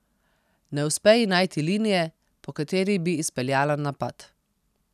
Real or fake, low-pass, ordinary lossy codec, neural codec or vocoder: real; 14.4 kHz; none; none